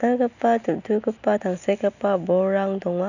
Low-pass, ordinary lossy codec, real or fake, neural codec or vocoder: 7.2 kHz; none; real; none